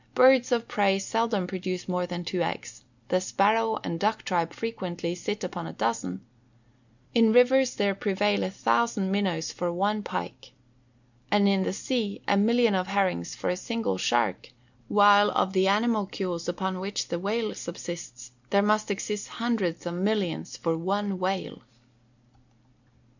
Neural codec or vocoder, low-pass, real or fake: none; 7.2 kHz; real